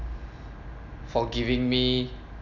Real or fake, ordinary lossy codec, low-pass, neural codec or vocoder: real; none; 7.2 kHz; none